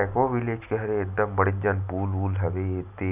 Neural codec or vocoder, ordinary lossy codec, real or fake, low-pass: none; none; real; 3.6 kHz